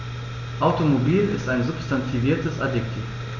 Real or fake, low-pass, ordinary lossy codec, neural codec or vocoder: real; 7.2 kHz; none; none